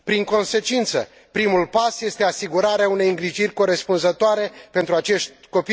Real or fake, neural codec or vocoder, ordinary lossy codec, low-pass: real; none; none; none